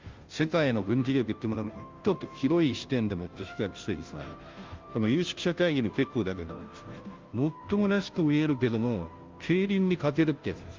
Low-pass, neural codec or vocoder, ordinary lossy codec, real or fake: 7.2 kHz; codec, 16 kHz, 0.5 kbps, FunCodec, trained on Chinese and English, 25 frames a second; Opus, 32 kbps; fake